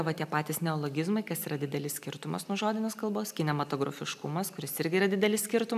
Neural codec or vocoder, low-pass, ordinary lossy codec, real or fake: none; 14.4 kHz; AAC, 96 kbps; real